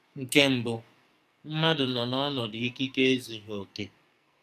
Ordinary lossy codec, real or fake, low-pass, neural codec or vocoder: none; fake; 14.4 kHz; codec, 44.1 kHz, 2.6 kbps, SNAC